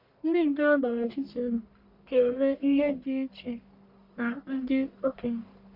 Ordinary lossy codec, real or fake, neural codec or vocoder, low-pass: none; fake; codec, 44.1 kHz, 1.7 kbps, Pupu-Codec; 5.4 kHz